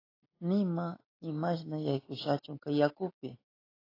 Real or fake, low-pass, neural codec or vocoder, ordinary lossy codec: real; 5.4 kHz; none; AAC, 24 kbps